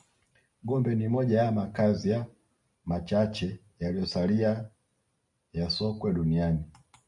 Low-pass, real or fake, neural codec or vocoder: 10.8 kHz; real; none